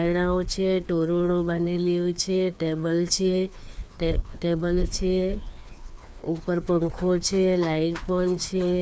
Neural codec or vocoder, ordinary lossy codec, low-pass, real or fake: codec, 16 kHz, 2 kbps, FreqCodec, larger model; none; none; fake